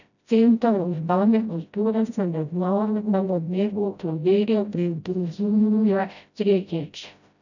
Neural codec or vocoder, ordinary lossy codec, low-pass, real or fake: codec, 16 kHz, 0.5 kbps, FreqCodec, smaller model; none; 7.2 kHz; fake